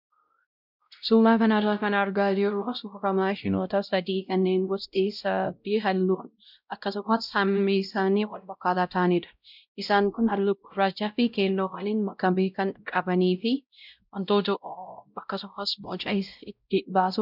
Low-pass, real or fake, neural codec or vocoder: 5.4 kHz; fake; codec, 16 kHz, 0.5 kbps, X-Codec, WavLM features, trained on Multilingual LibriSpeech